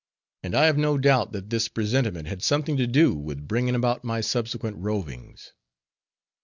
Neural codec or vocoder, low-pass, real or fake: none; 7.2 kHz; real